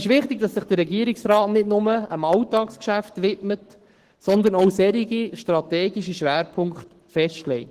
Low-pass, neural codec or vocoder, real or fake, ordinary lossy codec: 14.4 kHz; codec, 44.1 kHz, 7.8 kbps, Pupu-Codec; fake; Opus, 16 kbps